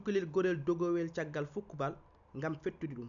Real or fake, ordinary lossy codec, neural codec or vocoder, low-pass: real; none; none; 7.2 kHz